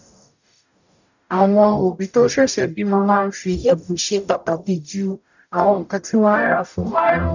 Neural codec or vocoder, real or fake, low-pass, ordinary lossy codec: codec, 44.1 kHz, 0.9 kbps, DAC; fake; 7.2 kHz; none